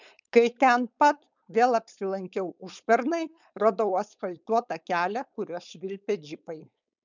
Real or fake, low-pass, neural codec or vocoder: fake; 7.2 kHz; codec, 16 kHz, 4.8 kbps, FACodec